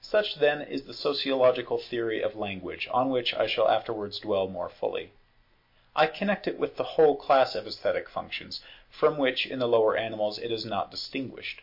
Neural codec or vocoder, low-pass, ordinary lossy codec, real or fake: none; 5.4 kHz; MP3, 32 kbps; real